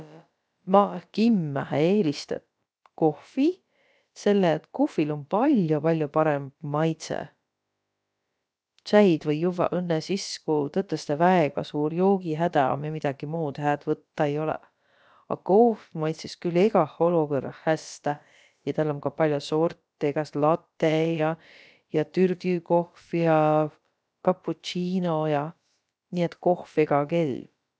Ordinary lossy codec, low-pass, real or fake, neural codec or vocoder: none; none; fake; codec, 16 kHz, about 1 kbps, DyCAST, with the encoder's durations